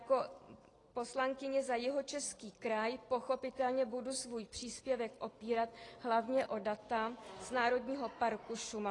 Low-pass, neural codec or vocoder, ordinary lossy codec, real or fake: 10.8 kHz; none; AAC, 32 kbps; real